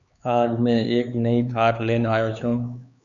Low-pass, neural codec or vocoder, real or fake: 7.2 kHz; codec, 16 kHz, 4 kbps, X-Codec, HuBERT features, trained on LibriSpeech; fake